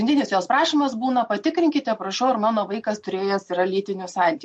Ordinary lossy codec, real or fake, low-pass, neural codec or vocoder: MP3, 48 kbps; real; 10.8 kHz; none